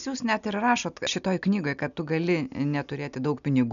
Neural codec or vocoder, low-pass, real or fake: none; 7.2 kHz; real